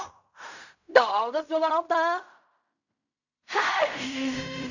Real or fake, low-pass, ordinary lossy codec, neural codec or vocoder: fake; 7.2 kHz; none; codec, 16 kHz in and 24 kHz out, 0.4 kbps, LongCat-Audio-Codec, fine tuned four codebook decoder